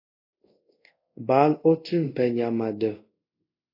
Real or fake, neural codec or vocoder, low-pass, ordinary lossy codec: fake; codec, 24 kHz, 0.5 kbps, DualCodec; 5.4 kHz; AAC, 32 kbps